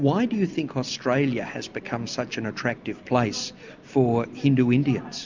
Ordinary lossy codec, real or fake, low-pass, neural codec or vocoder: MP3, 64 kbps; real; 7.2 kHz; none